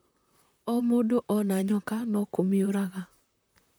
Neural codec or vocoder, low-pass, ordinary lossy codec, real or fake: vocoder, 44.1 kHz, 128 mel bands, Pupu-Vocoder; none; none; fake